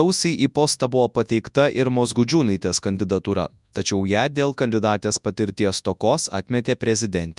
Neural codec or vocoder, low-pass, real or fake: codec, 24 kHz, 0.9 kbps, WavTokenizer, large speech release; 10.8 kHz; fake